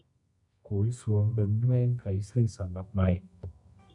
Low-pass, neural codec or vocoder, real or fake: 10.8 kHz; codec, 24 kHz, 0.9 kbps, WavTokenizer, medium music audio release; fake